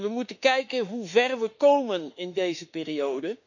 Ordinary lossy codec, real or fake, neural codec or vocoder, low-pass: none; fake; autoencoder, 48 kHz, 32 numbers a frame, DAC-VAE, trained on Japanese speech; 7.2 kHz